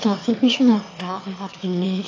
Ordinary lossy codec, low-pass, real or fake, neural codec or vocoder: AAC, 32 kbps; 7.2 kHz; fake; autoencoder, 22.05 kHz, a latent of 192 numbers a frame, VITS, trained on one speaker